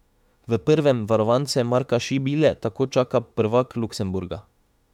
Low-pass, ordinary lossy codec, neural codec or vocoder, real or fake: 19.8 kHz; MP3, 96 kbps; autoencoder, 48 kHz, 32 numbers a frame, DAC-VAE, trained on Japanese speech; fake